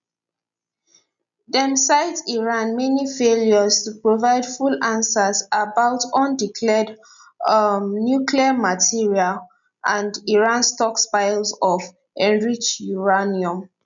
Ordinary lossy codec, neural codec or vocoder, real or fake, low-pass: none; none; real; 7.2 kHz